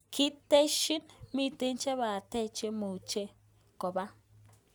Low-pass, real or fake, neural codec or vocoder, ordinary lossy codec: none; real; none; none